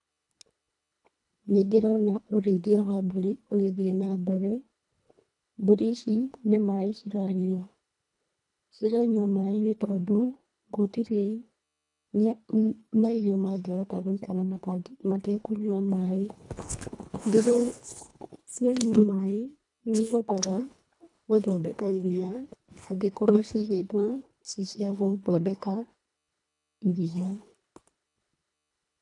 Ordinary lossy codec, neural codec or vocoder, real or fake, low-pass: none; codec, 24 kHz, 1.5 kbps, HILCodec; fake; 10.8 kHz